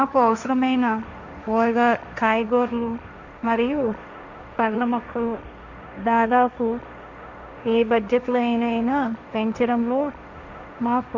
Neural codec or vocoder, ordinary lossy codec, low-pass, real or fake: codec, 16 kHz, 1.1 kbps, Voila-Tokenizer; none; 7.2 kHz; fake